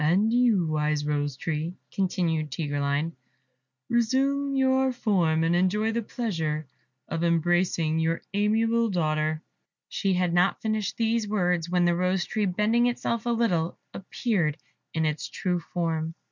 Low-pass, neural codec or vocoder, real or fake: 7.2 kHz; none; real